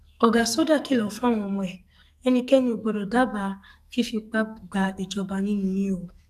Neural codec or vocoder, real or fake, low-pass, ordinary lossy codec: codec, 44.1 kHz, 2.6 kbps, SNAC; fake; 14.4 kHz; none